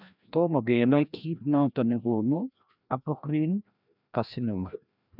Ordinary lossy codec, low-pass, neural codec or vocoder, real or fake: none; 5.4 kHz; codec, 16 kHz, 1 kbps, FreqCodec, larger model; fake